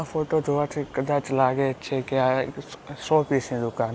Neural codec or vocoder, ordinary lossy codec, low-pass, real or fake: none; none; none; real